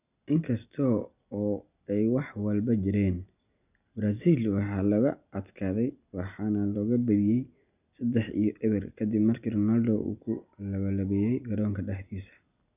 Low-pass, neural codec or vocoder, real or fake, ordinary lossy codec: 3.6 kHz; none; real; none